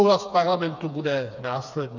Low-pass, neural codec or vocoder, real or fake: 7.2 kHz; codec, 16 kHz, 4 kbps, FreqCodec, smaller model; fake